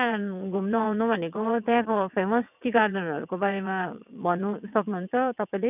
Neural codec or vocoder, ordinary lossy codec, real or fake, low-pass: vocoder, 22.05 kHz, 80 mel bands, WaveNeXt; none; fake; 3.6 kHz